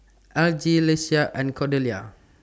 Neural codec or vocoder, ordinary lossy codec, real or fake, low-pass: none; none; real; none